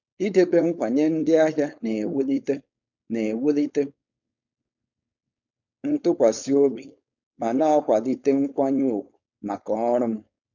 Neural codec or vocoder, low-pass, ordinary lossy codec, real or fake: codec, 16 kHz, 4.8 kbps, FACodec; 7.2 kHz; none; fake